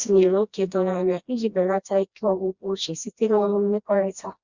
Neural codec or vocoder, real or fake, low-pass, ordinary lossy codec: codec, 16 kHz, 1 kbps, FreqCodec, smaller model; fake; 7.2 kHz; Opus, 64 kbps